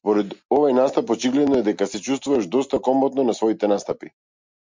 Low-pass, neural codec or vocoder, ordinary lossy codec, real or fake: 7.2 kHz; none; MP3, 64 kbps; real